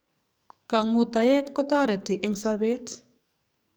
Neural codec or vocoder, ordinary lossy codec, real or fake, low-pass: codec, 44.1 kHz, 2.6 kbps, SNAC; none; fake; none